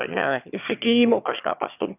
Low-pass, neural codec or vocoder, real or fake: 3.6 kHz; autoencoder, 22.05 kHz, a latent of 192 numbers a frame, VITS, trained on one speaker; fake